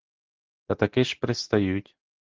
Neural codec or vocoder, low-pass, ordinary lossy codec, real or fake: none; 7.2 kHz; Opus, 16 kbps; real